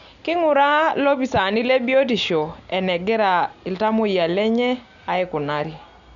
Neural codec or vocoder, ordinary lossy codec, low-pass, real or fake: none; none; 7.2 kHz; real